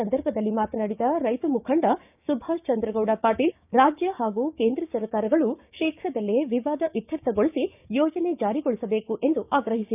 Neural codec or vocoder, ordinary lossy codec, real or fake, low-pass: codec, 16 kHz, 6 kbps, DAC; none; fake; 3.6 kHz